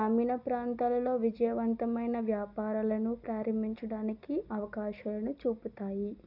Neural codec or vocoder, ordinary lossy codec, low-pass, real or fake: none; none; 5.4 kHz; real